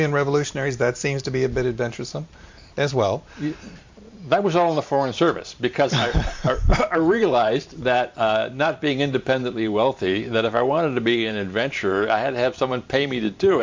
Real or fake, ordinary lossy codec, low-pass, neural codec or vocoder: fake; MP3, 48 kbps; 7.2 kHz; vocoder, 44.1 kHz, 128 mel bands every 512 samples, BigVGAN v2